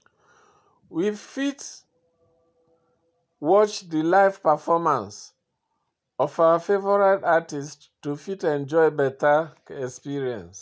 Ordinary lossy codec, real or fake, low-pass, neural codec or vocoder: none; real; none; none